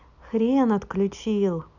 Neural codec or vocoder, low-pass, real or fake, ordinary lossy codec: none; 7.2 kHz; real; none